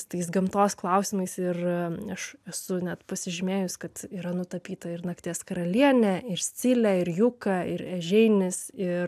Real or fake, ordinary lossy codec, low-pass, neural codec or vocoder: real; AAC, 96 kbps; 14.4 kHz; none